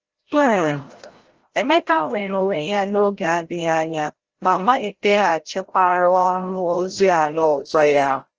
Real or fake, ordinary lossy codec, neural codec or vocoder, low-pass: fake; Opus, 16 kbps; codec, 16 kHz, 0.5 kbps, FreqCodec, larger model; 7.2 kHz